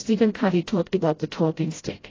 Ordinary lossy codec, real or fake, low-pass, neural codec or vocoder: AAC, 32 kbps; fake; 7.2 kHz; codec, 16 kHz, 0.5 kbps, FreqCodec, smaller model